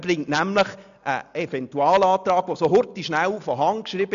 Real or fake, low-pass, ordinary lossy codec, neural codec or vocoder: real; 7.2 kHz; none; none